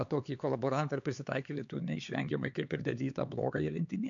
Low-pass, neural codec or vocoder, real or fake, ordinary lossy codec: 7.2 kHz; codec, 16 kHz, 4 kbps, X-Codec, HuBERT features, trained on LibriSpeech; fake; MP3, 64 kbps